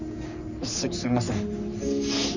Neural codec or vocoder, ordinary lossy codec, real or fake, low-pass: codec, 44.1 kHz, 3.4 kbps, Pupu-Codec; none; fake; 7.2 kHz